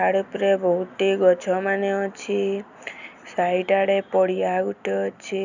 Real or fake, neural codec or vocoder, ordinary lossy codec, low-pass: real; none; none; 7.2 kHz